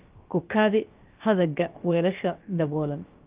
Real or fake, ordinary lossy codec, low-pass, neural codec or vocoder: fake; Opus, 32 kbps; 3.6 kHz; codec, 16 kHz, about 1 kbps, DyCAST, with the encoder's durations